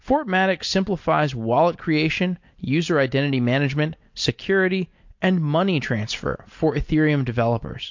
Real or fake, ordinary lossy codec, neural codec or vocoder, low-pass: real; MP3, 48 kbps; none; 7.2 kHz